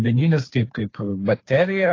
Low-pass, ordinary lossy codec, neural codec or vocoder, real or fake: 7.2 kHz; AAC, 32 kbps; codec, 16 kHz, 2 kbps, FunCodec, trained on Chinese and English, 25 frames a second; fake